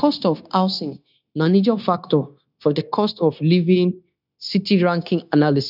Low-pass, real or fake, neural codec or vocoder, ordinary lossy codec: 5.4 kHz; fake; codec, 16 kHz, 0.9 kbps, LongCat-Audio-Codec; none